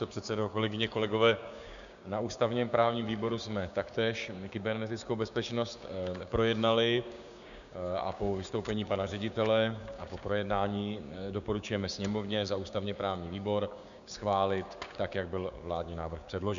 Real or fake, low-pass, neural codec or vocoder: fake; 7.2 kHz; codec, 16 kHz, 6 kbps, DAC